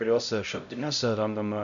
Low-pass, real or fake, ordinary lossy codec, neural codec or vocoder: 7.2 kHz; fake; MP3, 96 kbps; codec, 16 kHz, 0.5 kbps, X-Codec, HuBERT features, trained on LibriSpeech